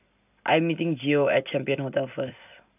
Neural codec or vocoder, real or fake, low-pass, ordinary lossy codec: none; real; 3.6 kHz; none